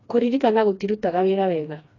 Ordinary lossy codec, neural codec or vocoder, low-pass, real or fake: AAC, 32 kbps; codec, 16 kHz, 2 kbps, FreqCodec, smaller model; 7.2 kHz; fake